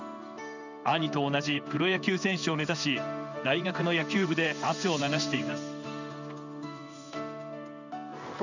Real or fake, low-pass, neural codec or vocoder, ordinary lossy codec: fake; 7.2 kHz; codec, 16 kHz in and 24 kHz out, 1 kbps, XY-Tokenizer; none